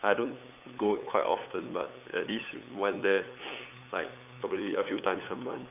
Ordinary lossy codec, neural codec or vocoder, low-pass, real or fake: none; codec, 16 kHz, 8 kbps, FunCodec, trained on LibriTTS, 25 frames a second; 3.6 kHz; fake